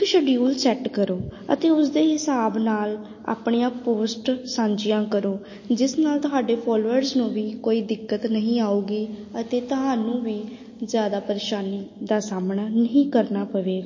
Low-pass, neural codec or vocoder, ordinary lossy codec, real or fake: 7.2 kHz; none; MP3, 32 kbps; real